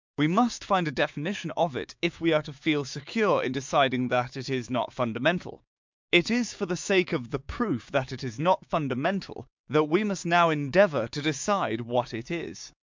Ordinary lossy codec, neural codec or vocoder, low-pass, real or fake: MP3, 64 kbps; codec, 16 kHz, 6 kbps, DAC; 7.2 kHz; fake